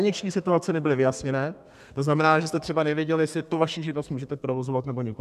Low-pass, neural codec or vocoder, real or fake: 14.4 kHz; codec, 32 kHz, 1.9 kbps, SNAC; fake